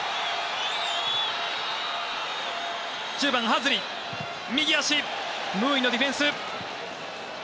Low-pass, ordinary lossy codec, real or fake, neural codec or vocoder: none; none; real; none